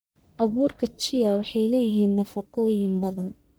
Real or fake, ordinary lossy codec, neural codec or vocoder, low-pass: fake; none; codec, 44.1 kHz, 1.7 kbps, Pupu-Codec; none